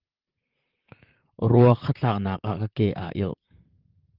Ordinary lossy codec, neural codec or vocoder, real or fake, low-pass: Opus, 32 kbps; vocoder, 44.1 kHz, 128 mel bands, Pupu-Vocoder; fake; 5.4 kHz